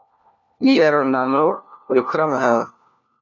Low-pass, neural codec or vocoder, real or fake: 7.2 kHz; codec, 16 kHz, 1 kbps, FunCodec, trained on LibriTTS, 50 frames a second; fake